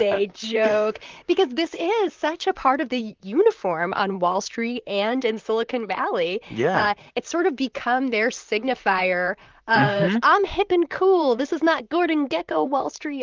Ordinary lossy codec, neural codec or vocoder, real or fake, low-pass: Opus, 32 kbps; vocoder, 44.1 kHz, 128 mel bands, Pupu-Vocoder; fake; 7.2 kHz